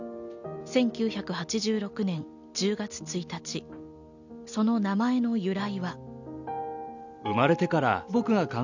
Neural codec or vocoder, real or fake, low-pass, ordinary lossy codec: none; real; 7.2 kHz; none